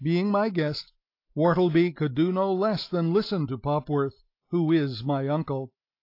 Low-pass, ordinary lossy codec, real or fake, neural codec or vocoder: 5.4 kHz; AAC, 32 kbps; real; none